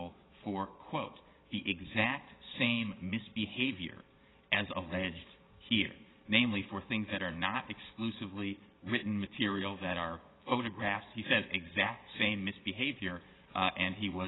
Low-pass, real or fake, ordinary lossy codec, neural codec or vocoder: 7.2 kHz; fake; AAC, 16 kbps; vocoder, 22.05 kHz, 80 mel bands, Vocos